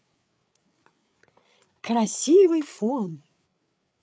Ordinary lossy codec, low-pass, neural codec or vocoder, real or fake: none; none; codec, 16 kHz, 8 kbps, FreqCodec, larger model; fake